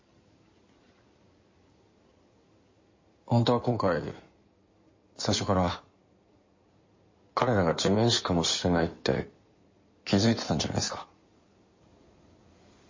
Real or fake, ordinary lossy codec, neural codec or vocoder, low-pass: fake; MP3, 32 kbps; codec, 16 kHz in and 24 kHz out, 2.2 kbps, FireRedTTS-2 codec; 7.2 kHz